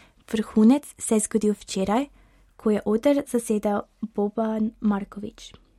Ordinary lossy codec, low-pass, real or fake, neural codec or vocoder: MP3, 64 kbps; 19.8 kHz; real; none